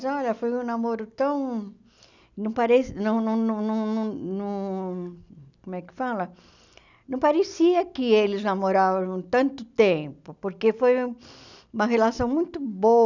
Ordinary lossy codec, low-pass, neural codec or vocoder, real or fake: none; 7.2 kHz; none; real